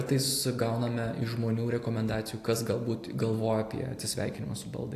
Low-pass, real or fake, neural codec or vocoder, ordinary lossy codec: 14.4 kHz; real; none; AAC, 64 kbps